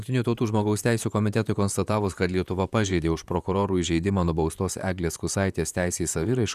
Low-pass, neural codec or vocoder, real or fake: 14.4 kHz; vocoder, 44.1 kHz, 128 mel bands, Pupu-Vocoder; fake